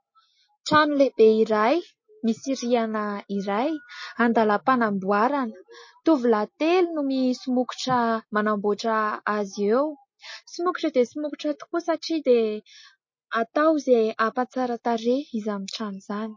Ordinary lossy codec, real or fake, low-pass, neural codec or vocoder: MP3, 32 kbps; real; 7.2 kHz; none